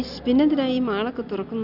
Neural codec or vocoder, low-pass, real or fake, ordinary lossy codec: none; 5.4 kHz; real; Opus, 64 kbps